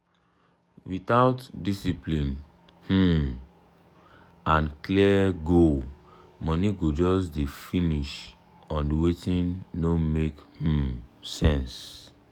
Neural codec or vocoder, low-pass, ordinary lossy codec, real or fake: codec, 44.1 kHz, 7.8 kbps, DAC; 19.8 kHz; none; fake